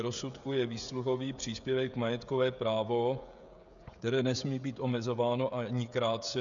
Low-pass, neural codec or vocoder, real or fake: 7.2 kHz; codec, 16 kHz, 16 kbps, FreqCodec, smaller model; fake